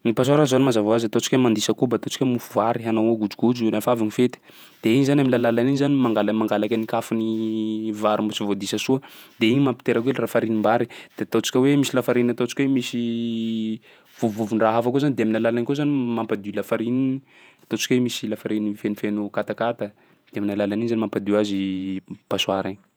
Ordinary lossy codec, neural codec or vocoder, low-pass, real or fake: none; vocoder, 48 kHz, 128 mel bands, Vocos; none; fake